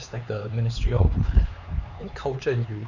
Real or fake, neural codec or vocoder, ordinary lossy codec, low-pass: fake; codec, 16 kHz, 4 kbps, X-Codec, HuBERT features, trained on LibriSpeech; none; 7.2 kHz